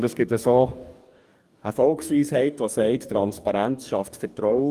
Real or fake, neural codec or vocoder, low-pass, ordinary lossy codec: fake; codec, 44.1 kHz, 2.6 kbps, DAC; 14.4 kHz; Opus, 32 kbps